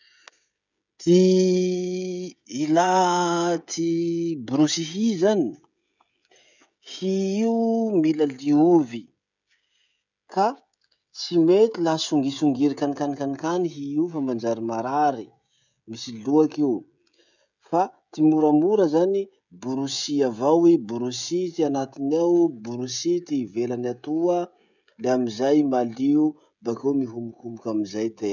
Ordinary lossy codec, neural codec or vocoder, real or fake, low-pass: none; codec, 16 kHz, 16 kbps, FreqCodec, smaller model; fake; 7.2 kHz